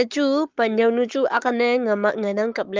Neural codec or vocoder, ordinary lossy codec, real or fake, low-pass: codec, 44.1 kHz, 7.8 kbps, Pupu-Codec; Opus, 24 kbps; fake; 7.2 kHz